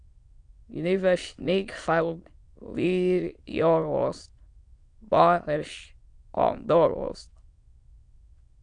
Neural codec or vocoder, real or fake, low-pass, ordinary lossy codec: autoencoder, 22.05 kHz, a latent of 192 numbers a frame, VITS, trained on many speakers; fake; 9.9 kHz; Opus, 64 kbps